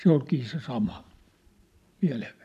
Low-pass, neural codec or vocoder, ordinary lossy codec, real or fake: 14.4 kHz; none; none; real